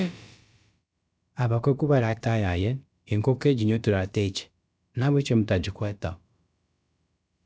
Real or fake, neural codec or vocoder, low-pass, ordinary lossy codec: fake; codec, 16 kHz, about 1 kbps, DyCAST, with the encoder's durations; none; none